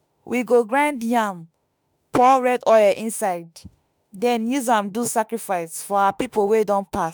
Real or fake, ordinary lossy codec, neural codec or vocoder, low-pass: fake; none; autoencoder, 48 kHz, 32 numbers a frame, DAC-VAE, trained on Japanese speech; none